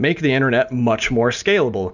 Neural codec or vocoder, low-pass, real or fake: none; 7.2 kHz; real